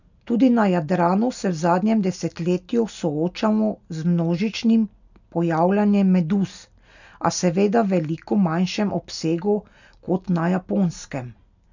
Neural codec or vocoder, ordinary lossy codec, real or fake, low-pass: none; none; real; 7.2 kHz